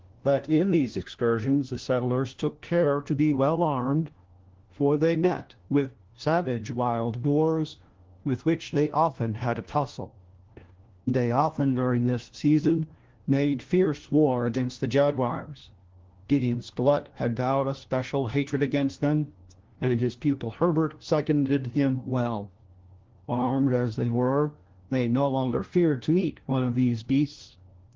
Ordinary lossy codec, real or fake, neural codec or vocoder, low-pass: Opus, 16 kbps; fake; codec, 16 kHz, 1 kbps, FunCodec, trained on LibriTTS, 50 frames a second; 7.2 kHz